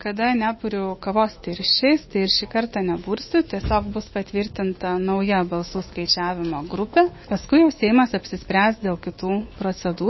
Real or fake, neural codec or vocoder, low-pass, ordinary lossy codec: fake; autoencoder, 48 kHz, 128 numbers a frame, DAC-VAE, trained on Japanese speech; 7.2 kHz; MP3, 24 kbps